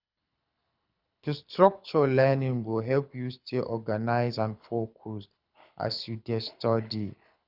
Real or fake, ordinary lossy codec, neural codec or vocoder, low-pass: fake; Opus, 64 kbps; codec, 24 kHz, 6 kbps, HILCodec; 5.4 kHz